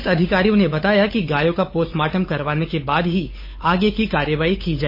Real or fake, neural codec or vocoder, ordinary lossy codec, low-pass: fake; codec, 16 kHz, 8 kbps, FunCodec, trained on Chinese and English, 25 frames a second; MP3, 24 kbps; 5.4 kHz